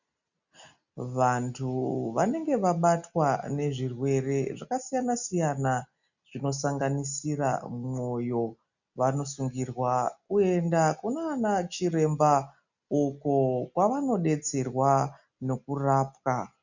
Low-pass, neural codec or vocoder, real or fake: 7.2 kHz; none; real